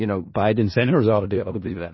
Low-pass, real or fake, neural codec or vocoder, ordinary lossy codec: 7.2 kHz; fake; codec, 16 kHz in and 24 kHz out, 0.4 kbps, LongCat-Audio-Codec, four codebook decoder; MP3, 24 kbps